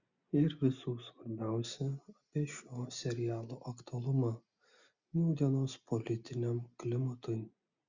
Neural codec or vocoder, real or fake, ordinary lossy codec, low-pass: none; real; Opus, 64 kbps; 7.2 kHz